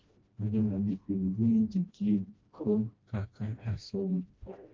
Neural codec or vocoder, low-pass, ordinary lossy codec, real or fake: codec, 16 kHz, 1 kbps, FreqCodec, smaller model; 7.2 kHz; Opus, 24 kbps; fake